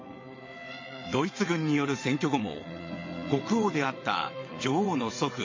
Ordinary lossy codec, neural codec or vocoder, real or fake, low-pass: MP3, 32 kbps; vocoder, 22.05 kHz, 80 mel bands, WaveNeXt; fake; 7.2 kHz